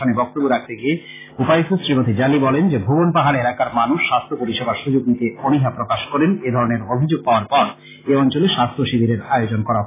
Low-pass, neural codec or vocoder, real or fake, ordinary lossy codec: 3.6 kHz; none; real; AAC, 16 kbps